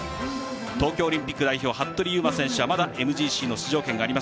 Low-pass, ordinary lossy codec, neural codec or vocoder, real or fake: none; none; none; real